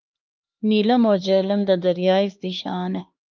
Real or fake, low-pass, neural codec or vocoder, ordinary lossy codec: fake; 7.2 kHz; codec, 16 kHz, 2 kbps, X-Codec, HuBERT features, trained on LibriSpeech; Opus, 24 kbps